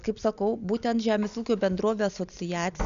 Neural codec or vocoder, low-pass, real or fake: none; 7.2 kHz; real